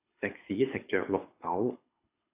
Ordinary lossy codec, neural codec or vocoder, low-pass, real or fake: AAC, 32 kbps; codec, 24 kHz, 6 kbps, HILCodec; 3.6 kHz; fake